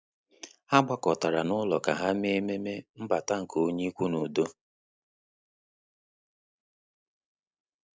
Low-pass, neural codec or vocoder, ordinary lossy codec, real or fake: none; none; none; real